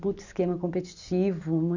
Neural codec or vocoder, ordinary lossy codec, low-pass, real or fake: none; none; 7.2 kHz; real